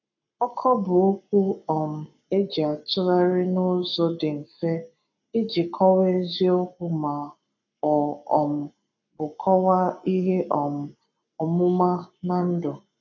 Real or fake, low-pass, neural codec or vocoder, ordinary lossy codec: fake; 7.2 kHz; codec, 44.1 kHz, 7.8 kbps, Pupu-Codec; none